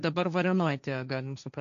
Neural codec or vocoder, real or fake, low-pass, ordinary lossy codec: codec, 16 kHz, 1.1 kbps, Voila-Tokenizer; fake; 7.2 kHz; MP3, 96 kbps